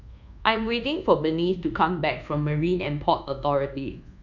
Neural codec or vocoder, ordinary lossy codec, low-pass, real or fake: codec, 24 kHz, 1.2 kbps, DualCodec; none; 7.2 kHz; fake